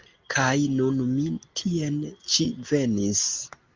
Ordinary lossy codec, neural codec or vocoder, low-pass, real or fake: Opus, 24 kbps; none; 7.2 kHz; real